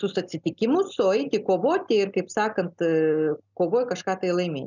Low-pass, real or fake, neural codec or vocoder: 7.2 kHz; real; none